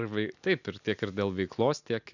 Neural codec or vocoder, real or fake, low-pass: none; real; 7.2 kHz